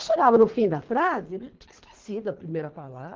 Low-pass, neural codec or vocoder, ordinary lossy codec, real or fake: 7.2 kHz; codec, 24 kHz, 3 kbps, HILCodec; Opus, 16 kbps; fake